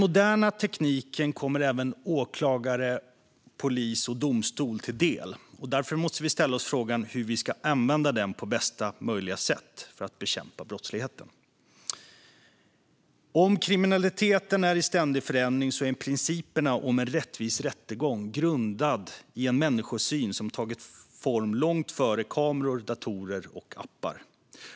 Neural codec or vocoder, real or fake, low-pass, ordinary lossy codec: none; real; none; none